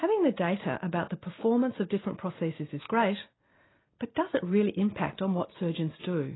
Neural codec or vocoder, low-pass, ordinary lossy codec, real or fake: none; 7.2 kHz; AAC, 16 kbps; real